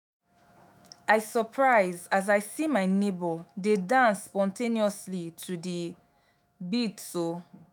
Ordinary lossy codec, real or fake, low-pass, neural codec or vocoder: none; fake; none; autoencoder, 48 kHz, 128 numbers a frame, DAC-VAE, trained on Japanese speech